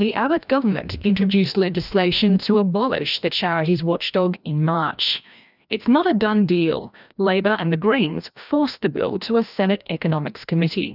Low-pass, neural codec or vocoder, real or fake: 5.4 kHz; codec, 16 kHz, 1 kbps, FreqCodec, larger model; fake